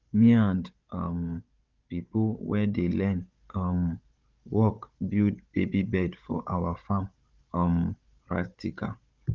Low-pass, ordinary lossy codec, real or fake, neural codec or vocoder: 7.2 kHz; Opus, 32 kbps; fake; codec, 16 kHz, 16 kbps, FunCodec, trained on Chinese and English, 50 frames a second